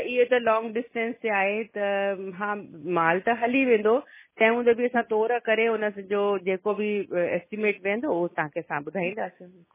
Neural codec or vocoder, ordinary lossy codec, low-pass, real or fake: none; MP3, 16 kbps; 3.6 kHz; real